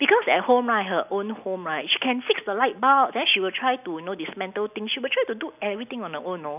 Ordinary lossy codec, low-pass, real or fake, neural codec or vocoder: none; 3.6 kHz; real; none